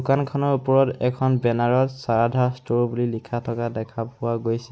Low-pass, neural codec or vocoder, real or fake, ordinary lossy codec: none; none; real; none